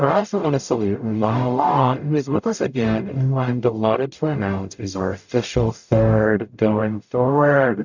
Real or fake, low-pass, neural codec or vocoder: fake; 7.2 kHz; codec, 44.1 kHz, 0.9 kbps, DAC